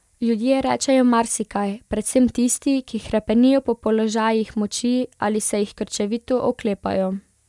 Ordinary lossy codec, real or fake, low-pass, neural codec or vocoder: none; real; none; none